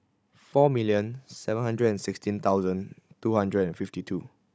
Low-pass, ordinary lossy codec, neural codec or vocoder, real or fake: none; none; codec, 16 kHz, 16 kbps, FunCodec, trained on Chinese and English, 50 frames a second; fake